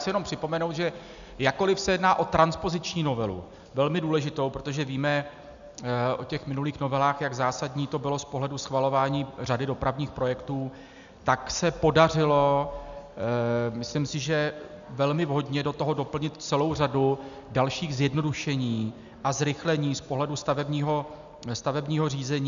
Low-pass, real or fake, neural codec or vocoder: 7.2 kHz; real; none